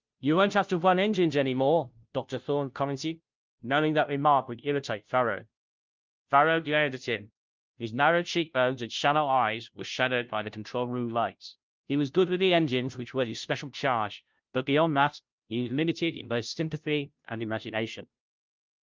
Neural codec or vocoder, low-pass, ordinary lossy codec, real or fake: codec, 16 kHz, 0.5 kbps, FunCodec, trained on Chinese and English, 25 frames a second; 7.2 kHz; Opus, 24 kbps; fake